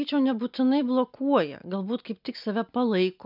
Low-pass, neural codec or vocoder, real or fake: 5.4 kHz; none; real